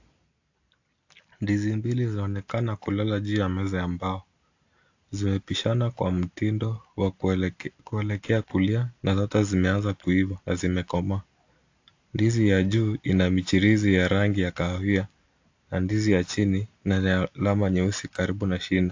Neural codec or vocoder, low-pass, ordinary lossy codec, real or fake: none; 7.2 kHz; AAC, 48 kbps; real